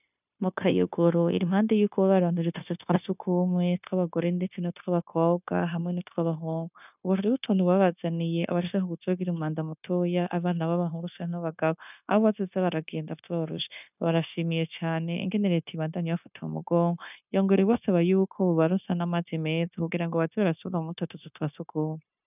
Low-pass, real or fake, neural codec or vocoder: 3.6 kHz; fake; codec, 16 kHz, 0.9 kbps, LongCat-Audio-Codec